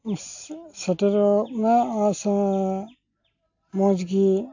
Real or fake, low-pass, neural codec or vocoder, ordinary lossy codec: real; 7.2 kHz; none; AAC, 48 kbps